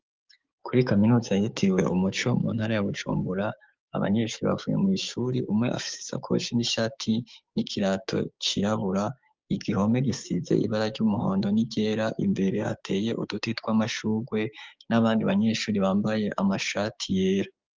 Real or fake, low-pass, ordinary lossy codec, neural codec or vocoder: fake; 7.2 kHz; Opus, 32 kbps; codec, 16 kHz, 6 kbps, DAC